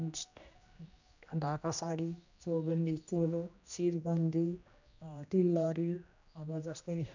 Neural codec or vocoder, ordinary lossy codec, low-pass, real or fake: codec, 16 kHz, 1 kbps, X-Codec, HuBERT features, trained on general audio; none; 7.2 kHz; fake